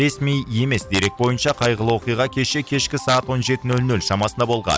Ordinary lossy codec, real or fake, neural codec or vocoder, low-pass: none; real; none; none